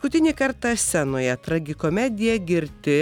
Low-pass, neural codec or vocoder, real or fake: 19.8 kHz; none; real